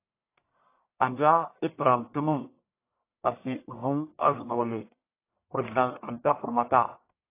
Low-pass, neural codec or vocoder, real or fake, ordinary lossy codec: 3.6 kHz; codec, 44.1 kHz, 1.7 kbps, Pupu-Codec; fake; AAC, 24 kbps